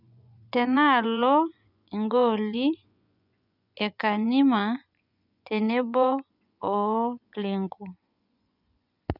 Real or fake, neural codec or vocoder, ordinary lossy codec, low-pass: fake; vocoder, 24 kHz, 100 mel bands, Vocos; none; 5.4 kHz